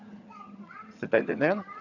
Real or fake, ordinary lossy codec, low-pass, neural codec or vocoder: fake; none; 7.2 kHz; vocoder, 22.05 kHz, 80 mel bands, HiFi-GAN